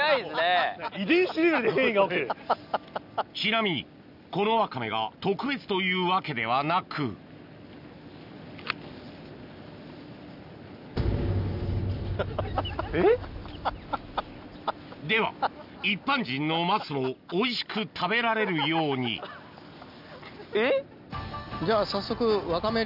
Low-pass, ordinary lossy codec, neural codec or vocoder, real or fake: 5.4 kHz; none; none; real